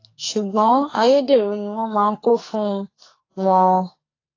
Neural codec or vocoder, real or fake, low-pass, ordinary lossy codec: codec, 44.1 kHz, 2.6 kbps, SNAC; fake; 7.2 kHz; AAC, 32 kbps